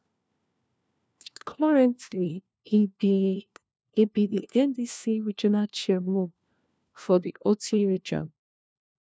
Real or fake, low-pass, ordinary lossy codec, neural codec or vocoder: fake; none; none; codec, 16 kHz, 1 kbps, FunCodec, trained on LibriTTS, 50 frames a second